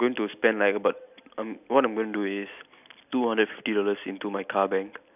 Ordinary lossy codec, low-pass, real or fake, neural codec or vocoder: none; 3.6 kHz; real; none